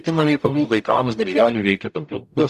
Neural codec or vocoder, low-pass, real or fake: codec, 44.1 kHz, 0.9 kbps, DAC; 14.4 kHz; fake